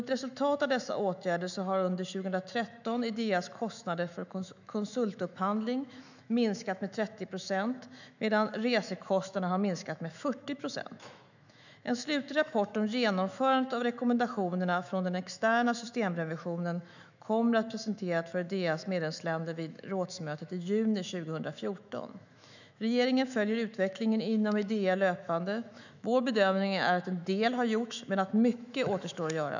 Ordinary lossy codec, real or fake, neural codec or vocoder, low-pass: none; fake; autoencoder, 48 kHz, 128 numbers a frame, DAC-VAE, trained on Japanese speech; 7.2 kHz